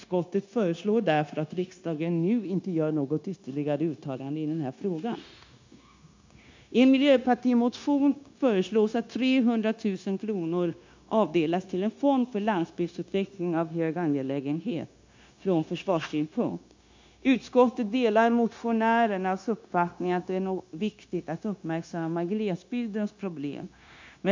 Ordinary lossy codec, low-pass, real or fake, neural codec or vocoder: MP3, 64 kbps; 7.2 kHz; fake; codec, 16 kHz, 0.9 kbps, LongCat-Audio-Codec